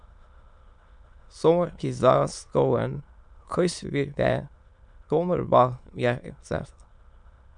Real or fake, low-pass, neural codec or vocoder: fake; 9.9 kHz; autoencoder, 22.05 kHz, a latent of 192 numbers a frame, VITS, trained on many speakers